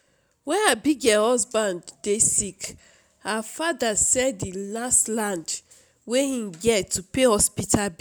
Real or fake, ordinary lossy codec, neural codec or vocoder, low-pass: real; none; none; none